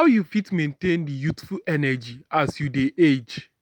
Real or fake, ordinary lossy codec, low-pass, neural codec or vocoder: real; none; 19.8 kHz; none